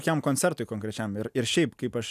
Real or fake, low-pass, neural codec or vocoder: real; 14.4 kHz; none